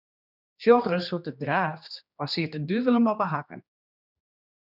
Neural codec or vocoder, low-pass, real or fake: codec, 16 kHz, 2 kbps, X-Codec, HuBERT features, trained on general audio; 5.4 kHz; fake